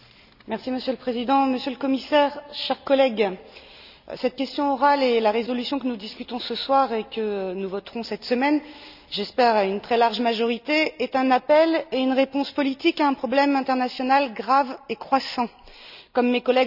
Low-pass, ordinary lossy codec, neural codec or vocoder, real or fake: 5.4 kHz; none; none; real